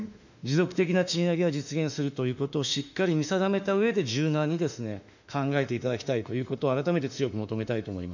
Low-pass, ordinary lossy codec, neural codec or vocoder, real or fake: 7.2 kHz; none; autoencoder, 48 kHz, 32 numbers a frame, DAC-VAE, trained on Japanese speech; fake